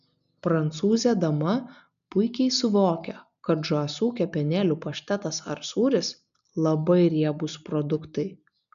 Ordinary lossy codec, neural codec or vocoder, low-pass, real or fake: MP3, 96 kbps; none; 7.2 kHz; real